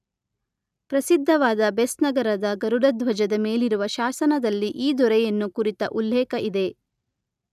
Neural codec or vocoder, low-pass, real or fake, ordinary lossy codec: none; 14.4 kHz; real; none